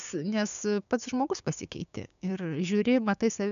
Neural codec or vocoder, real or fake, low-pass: codec, 16 kHz, 6 kbps, DAC; fake; 7.2 kHz